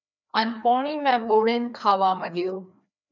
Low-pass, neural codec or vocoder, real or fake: 7.2 kHz; codec, 16 kHz, 2 kbps, FreqCodec, larger model; fake